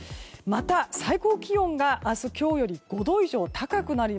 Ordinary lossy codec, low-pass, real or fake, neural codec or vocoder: none; none; real; none